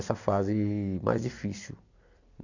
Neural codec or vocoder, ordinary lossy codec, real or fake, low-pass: vocoder, 44.1 kHz, 128 mel bands every 256 samples, BigVGAN v2; none; fake; 7.2 kHz